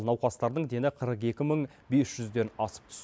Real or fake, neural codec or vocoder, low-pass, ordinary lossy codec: real; none; none; none